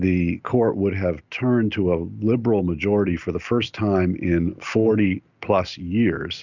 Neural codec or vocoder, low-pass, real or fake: none; 7.2 kHz; real